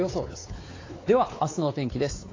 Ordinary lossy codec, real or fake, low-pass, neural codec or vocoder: AAC, 32 kbps; fake; 7.2 kHz; codec, 16 kHz, 4 kbps, X-Codec, WavLM features, trained on Multilingual LibriSpeech